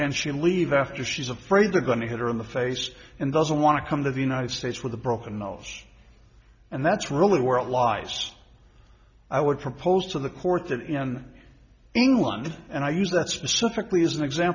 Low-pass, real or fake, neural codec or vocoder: 7.2 kHz; real; none